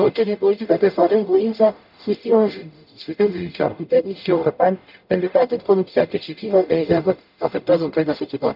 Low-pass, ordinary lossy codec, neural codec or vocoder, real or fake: 5.4 kHz; none; codec, 44.1 kHz, 0.9 kbps, DAC; fake